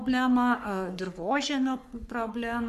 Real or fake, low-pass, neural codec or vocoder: fake; 14.4 kHz; codec, 44.1 kHz, 3.4 kbps, Pupu-Codec